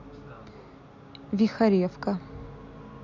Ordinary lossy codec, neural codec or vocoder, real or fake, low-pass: none; none; real; 7.2 kHz